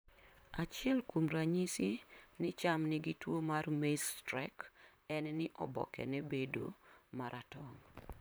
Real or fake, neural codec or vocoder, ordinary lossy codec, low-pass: fake; vocoder, 44.1 kHz, 128 mel bands, Pupu-Vocoder; none; none